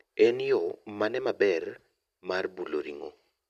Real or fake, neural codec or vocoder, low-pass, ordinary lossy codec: real; none; 14.4 kHz; none